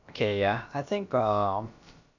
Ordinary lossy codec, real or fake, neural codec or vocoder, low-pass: none; fake; codec, 16 kHz, about 1 kbps, DyCAST, with the encoder's durations; 7.2 kHz